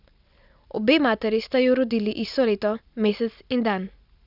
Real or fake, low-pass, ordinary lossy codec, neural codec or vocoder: real; 5.4 kHz; none; none